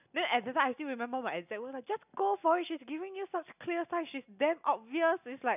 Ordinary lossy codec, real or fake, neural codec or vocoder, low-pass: none; real; none; 3.6 kHz